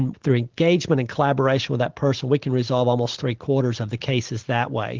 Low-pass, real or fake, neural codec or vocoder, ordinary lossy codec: 7.2 kHz; real; none; Opus, 16 kbps